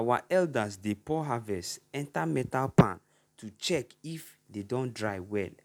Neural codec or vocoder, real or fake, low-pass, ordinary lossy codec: none; real; 19.8 kHz; none